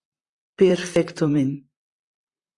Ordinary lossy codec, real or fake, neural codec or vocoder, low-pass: Opus, 64 kbps; fake; vocoder, 44.1 kHz, 128 mel bands, Pupu-Vocoder; 10.8 kHz